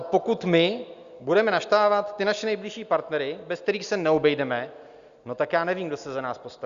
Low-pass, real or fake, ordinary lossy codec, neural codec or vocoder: 7.2 kHz; real; Opus, 64 kbps; none